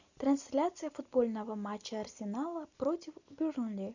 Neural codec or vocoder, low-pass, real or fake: none; 7.2 kHz; real